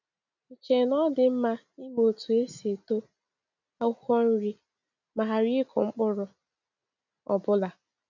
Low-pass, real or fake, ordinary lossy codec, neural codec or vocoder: 7.2 kHz; real; none; none